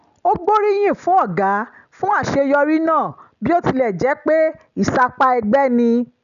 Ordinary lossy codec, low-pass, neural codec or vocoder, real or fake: none; 7.2 kHz; none; real